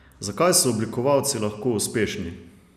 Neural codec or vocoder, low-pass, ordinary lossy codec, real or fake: none; 14.4 kHz; none; real